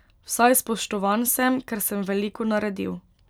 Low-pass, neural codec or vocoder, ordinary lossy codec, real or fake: none; none; none; real